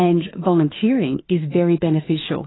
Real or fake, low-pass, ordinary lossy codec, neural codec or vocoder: fake; 7.2 kHz; AAC, 16 kbps; autoencoder, 48 kHz, 32 numbers a frame, DAC-VAE, trained on Japanese speech